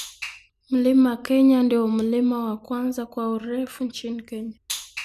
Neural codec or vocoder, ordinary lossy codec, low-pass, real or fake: none; none; 14.4 kHz; real